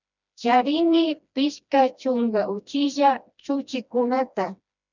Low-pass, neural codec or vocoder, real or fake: 7.2 kHz; codec, 16 kHz, 1 kbps, FreqCodec, smaller model; fake